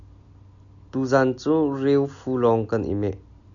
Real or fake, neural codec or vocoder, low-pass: real; none; 7.2 kHz